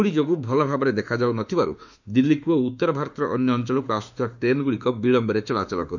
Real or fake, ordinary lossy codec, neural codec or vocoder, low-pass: fake; none; autoencoder, 48 kHz, 32 numbers a frame, DAC-VAE, trained on Japanese speech; 7.2 kHz